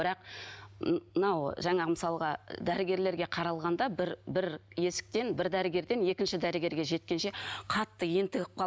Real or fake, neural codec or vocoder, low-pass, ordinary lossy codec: real; none; none; none